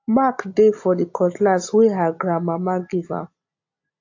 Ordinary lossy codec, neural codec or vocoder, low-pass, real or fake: AAC, 48 kbps; none; 7.2 kHz; real